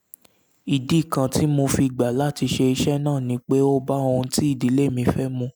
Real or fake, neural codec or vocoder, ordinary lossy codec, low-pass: real; none; none; none